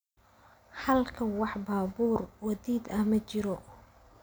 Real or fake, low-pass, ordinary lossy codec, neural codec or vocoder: real; none; none; none